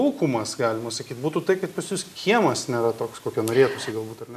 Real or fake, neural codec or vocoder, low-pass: real; none; 14.4 kHz